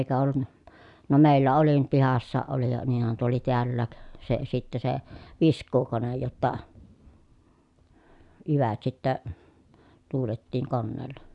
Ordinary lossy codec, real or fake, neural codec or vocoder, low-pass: none; real; none; 10.8 kHz